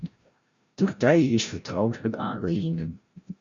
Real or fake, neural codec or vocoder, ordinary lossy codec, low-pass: fake; codec, 16 kHz, 0.5 kbps, FreqCodec, larger model; Opus, 64 kbps; 7.2 kHz